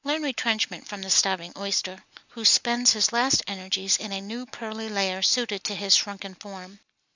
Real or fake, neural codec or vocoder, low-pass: real; none; 7.2 kHz